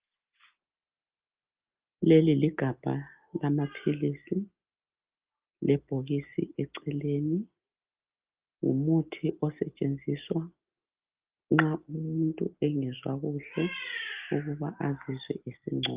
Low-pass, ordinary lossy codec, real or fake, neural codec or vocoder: 3.6 kHz; Opus, 32 kbps; real; none